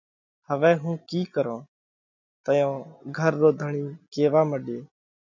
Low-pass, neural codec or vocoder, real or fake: 7.2 kHz; none; real